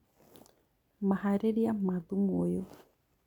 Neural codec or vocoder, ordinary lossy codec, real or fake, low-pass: none; none; real; 19.8 kHz